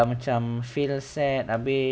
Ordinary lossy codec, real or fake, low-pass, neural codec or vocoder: none; real; none; none